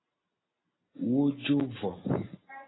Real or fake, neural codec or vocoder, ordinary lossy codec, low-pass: real; none; AAC, 16 kbps; 7.2 kHz